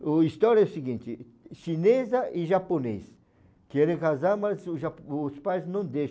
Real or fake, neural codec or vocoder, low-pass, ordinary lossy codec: real; none; none; none